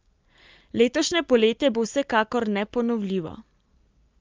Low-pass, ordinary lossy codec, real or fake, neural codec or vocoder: 7.2 kHz; Opus, 24 kbps; real; none